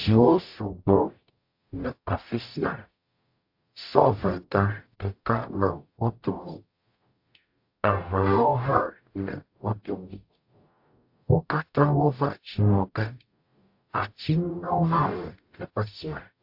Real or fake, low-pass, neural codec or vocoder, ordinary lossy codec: fake; 5.4 kHz; codec, 44.1 kHz, 0.9 kbps, DAC; AAC, 48 kbps